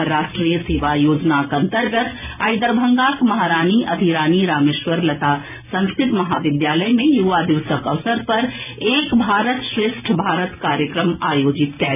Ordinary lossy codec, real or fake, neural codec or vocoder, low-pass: none; real; none; 3.6 kHz